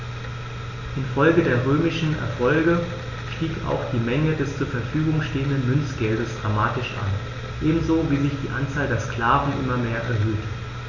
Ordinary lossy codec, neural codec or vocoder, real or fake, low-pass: none; none; real; 7.2 kHz